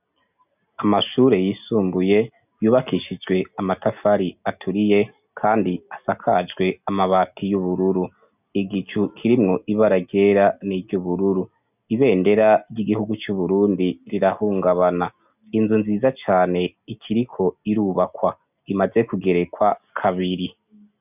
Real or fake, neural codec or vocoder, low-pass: real; none; 3.6 kHz